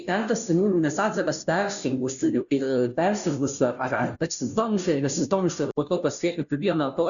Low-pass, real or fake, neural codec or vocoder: 7.2 kHz; fake; codec, 16 kHz, 0.5 kbps, FunCodec, trained on Chinese and English, 25 frames a second